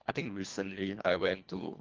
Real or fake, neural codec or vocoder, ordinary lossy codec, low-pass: fake; codec, 24 kHz, 1.5 kbps, HILCodec; Opus, 32 kbps; 7.2 kHz